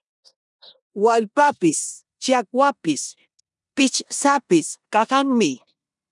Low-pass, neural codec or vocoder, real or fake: 10.8 kHz; codec, 16 kHz in and 24 kHz out, 0.9 kbps, LongCat-Audio-Codec, four codebook decoder; fake